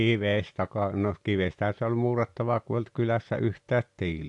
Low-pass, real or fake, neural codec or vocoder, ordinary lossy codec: 10.8 kHz; real; none; AAC, 64 kbps